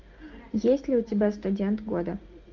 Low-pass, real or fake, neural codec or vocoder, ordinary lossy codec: 7.2 kHz; real; none; Opus, 24 kbps